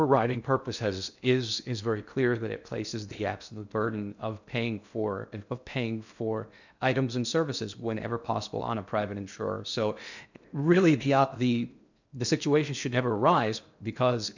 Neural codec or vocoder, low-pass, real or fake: codec, 16 kHz in and 24 kHz out, 0.6 kbps, FocalCodec, streaming, 2048 codes; 7.2 kHz; fake